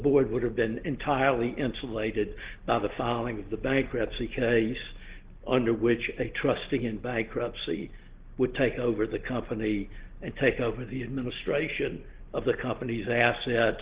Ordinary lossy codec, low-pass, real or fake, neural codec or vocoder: Opus, 16 kbps; 3.6 kHz; real; none